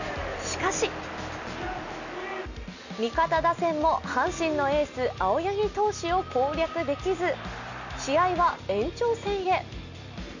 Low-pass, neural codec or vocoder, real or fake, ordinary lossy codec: 7.2 kHz; none; real; none